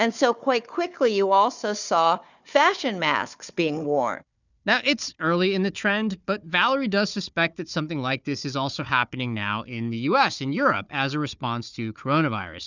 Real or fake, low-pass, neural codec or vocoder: fake; 7.2 kHz; codec, 16 kHz, 4 kbps, FunCodec, trained on Chinese and English, 50 frames a second